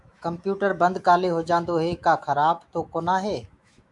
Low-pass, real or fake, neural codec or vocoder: 10.8 kHz; fake; autoencoder, 48 kHz, 128 numbers a frame, DAC-VAE, trained on Japanese speech